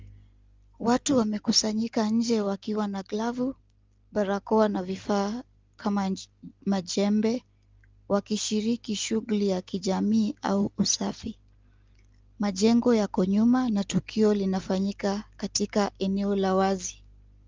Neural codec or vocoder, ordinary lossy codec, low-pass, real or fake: none; Opus, 32 kbps; 7.2 kHz; real